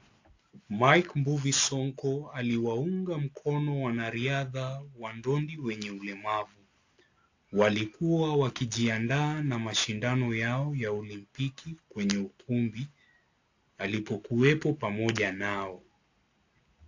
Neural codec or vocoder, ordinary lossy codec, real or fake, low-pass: none; AAC, 48 kbps; real; 7.2 kHz